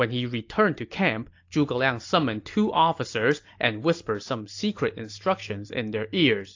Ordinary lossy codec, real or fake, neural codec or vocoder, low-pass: AAC, 48 kbps; real; none; 7.2 kHz